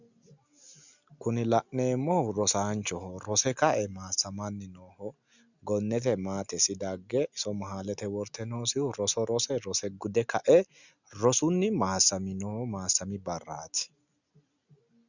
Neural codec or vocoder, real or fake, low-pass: none; real; 7.2 kHz